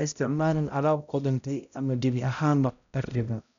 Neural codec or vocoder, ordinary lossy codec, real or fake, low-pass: codec, 16 kHz, 0.5 kbps, X-Codec, HuBERT features, trained on balanced general audio; none; fake; 7.2 kHz